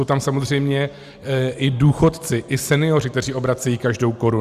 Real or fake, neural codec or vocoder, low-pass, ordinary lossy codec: real; none; 14.4 kHz; Opus, 64 kbps